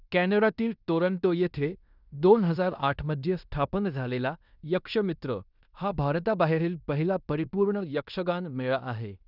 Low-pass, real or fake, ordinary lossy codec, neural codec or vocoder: 5.4 kHz; fake; none; codec, 16 kHz in and 24 kHz out, 0.9 kbps, LongCat-Audio-Codec, fine tuned four codebook decoder